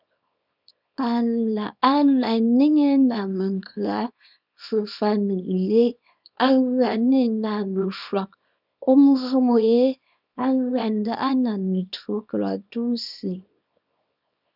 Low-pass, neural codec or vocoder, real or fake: 5.4 kHz; codec, 24 kHz, 0.9 kbps, WavTokenizer, small release; fake